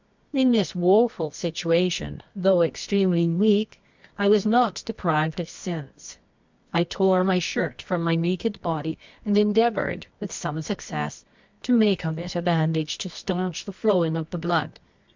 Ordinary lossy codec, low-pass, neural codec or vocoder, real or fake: MP3, 64 kbps; 7.2 kHz; codec, 24 kHz, 0.9 kbps, WavTokenizer, medium music audio release; fake